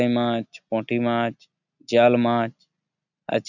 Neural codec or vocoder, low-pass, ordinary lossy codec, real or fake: none; 7.2 kHz; none; real